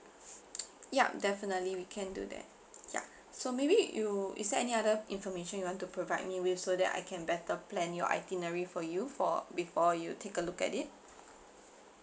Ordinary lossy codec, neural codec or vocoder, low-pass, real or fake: none; none; none; real